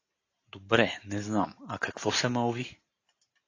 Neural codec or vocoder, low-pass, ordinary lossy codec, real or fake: none; 7.2 kHz; AAC, 32 kbps; real